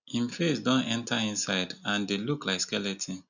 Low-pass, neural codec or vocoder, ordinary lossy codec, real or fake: 7.2 kHz; none; none; real